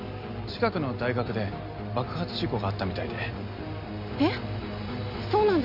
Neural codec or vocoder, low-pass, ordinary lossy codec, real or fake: none; 5.4 kHz; none; real